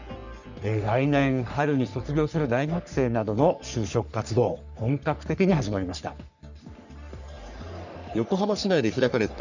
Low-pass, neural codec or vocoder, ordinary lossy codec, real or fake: 7.2 kHz; codec, 44.1 kHz, 3.4 kbps, Pupu-Codec; none; fake